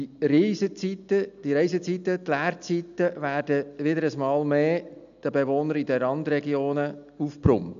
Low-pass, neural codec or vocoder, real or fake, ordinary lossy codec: 7.2 kHz; none; real; none